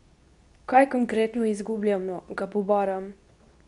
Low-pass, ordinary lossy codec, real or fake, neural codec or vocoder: 10.8 kHz; none; fake; codec, 24 kHz, 0.9 kbps, WavTokenizer, medium speech release version 2